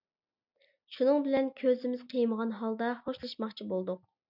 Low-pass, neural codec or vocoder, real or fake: 5.4 kHz; none; real